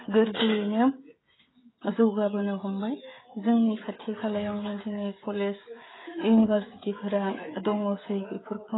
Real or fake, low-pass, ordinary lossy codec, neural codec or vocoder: fake; 7.2 kHz; AAC, 16 kbps; codec, 16 kHz, 16 kbps, FreqCodec, smaller model